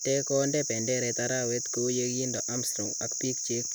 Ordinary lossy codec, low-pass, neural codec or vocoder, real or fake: none; none; none; real